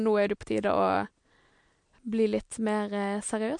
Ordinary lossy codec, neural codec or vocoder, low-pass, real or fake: MP3, 64 kbps; none; 9.9 kHz; real